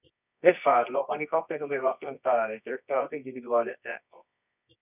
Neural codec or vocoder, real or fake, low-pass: codec, 24 kHz, 0.9 kbps, WavTokenizer, medium music audio release; fake; 3.6 kHz